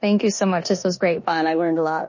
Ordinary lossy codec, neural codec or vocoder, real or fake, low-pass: MP3, 32 kbps; codec, 16 kHz in and 24 kHz out, 0.9 kbps, LongCat-Audio-Codec, four codebook decoder; fake; 7.2 kHz